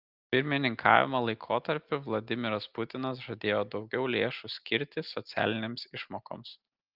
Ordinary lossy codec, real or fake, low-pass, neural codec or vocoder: Opus, 24 kbps; real; 5.4 kHz; none